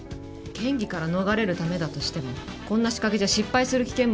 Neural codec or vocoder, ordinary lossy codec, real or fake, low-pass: none; none; real; none